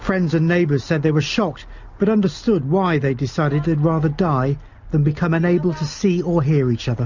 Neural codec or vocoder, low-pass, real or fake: none; 7.2 kHz; real